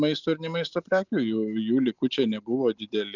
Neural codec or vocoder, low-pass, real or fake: none; 7.2 kHz; real